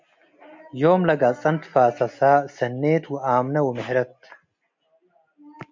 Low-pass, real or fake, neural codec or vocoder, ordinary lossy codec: 7.2 kHz; real; none; MP3, 64 kbps